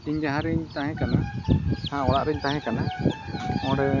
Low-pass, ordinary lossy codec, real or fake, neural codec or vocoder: 7.2 kHz; none; real; none